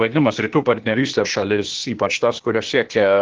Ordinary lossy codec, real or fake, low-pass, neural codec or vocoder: Opus, 16 kbps; fake; 7.2 kHz; codec, 16 kHz, 0.8 kbps, ZipCodec